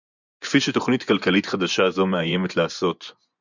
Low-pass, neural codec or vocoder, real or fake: 7.2 kHz; vocoder, 24 kHz, 100 mel bands, Vocos; fake